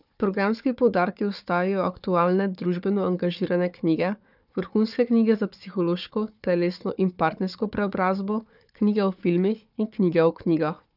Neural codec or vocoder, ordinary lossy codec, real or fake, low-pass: codec, 16 kHz, 4 kbps, FunCodec, trained on Chinese and English, 50 frames a second; none; fake; 5.4 kHz